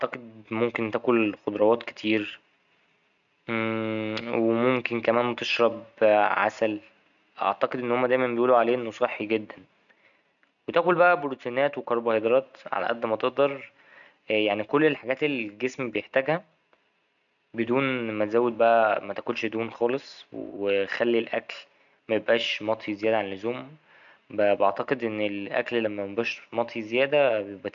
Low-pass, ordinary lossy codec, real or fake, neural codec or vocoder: 7.2 kHz; none; real; none